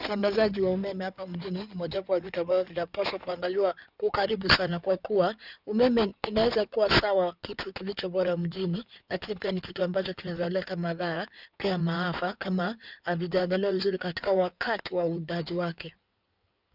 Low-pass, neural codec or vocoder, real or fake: 5.4 kHz; codec, 16 kHz in and 24 kHz out, 2.2 kbps, FireRedTTS-2 codec; fake